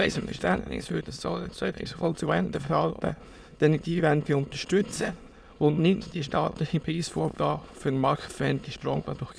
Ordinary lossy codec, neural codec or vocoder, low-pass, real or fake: none; autoencoder, 22.05 kHz, a latent of 192 numbers a frame, VITS, trained on many speakers; none; fake